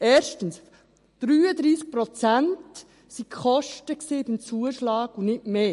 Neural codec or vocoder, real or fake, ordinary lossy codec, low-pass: none; real; MP3, 48 kbps; 14.4 kHz